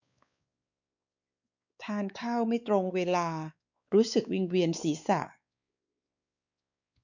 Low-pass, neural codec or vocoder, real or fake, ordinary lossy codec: 7.2 kHz; codec, 16 kHz, 4 kbps, X-Codec, WavLM features, trained on Multilingual LibriSpeech; fake; none